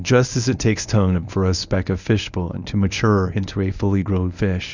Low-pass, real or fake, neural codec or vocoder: 7.2 kHz; fake; codec, 24 kHz, 0.9 kbps, WavTokenizer, medium speech release version 1